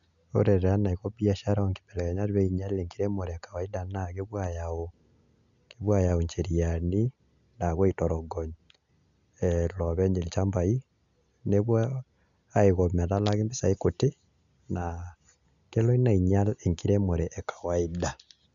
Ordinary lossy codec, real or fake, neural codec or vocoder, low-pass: none; real; none; 7.2 kHz